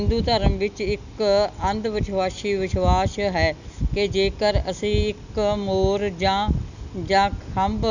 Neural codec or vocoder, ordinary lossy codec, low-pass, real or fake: none; none; 7.2 kHz; real